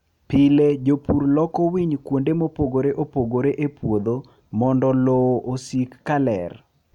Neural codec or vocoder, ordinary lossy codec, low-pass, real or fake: none; none; 19.8 kHz; real